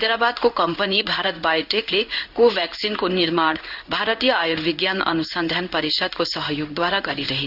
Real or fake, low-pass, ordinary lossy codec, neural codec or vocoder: fake; 5.4 kHz; none; codec, 16 kHz in and 24 kHz out, 1 kbps, XY-Tokenizer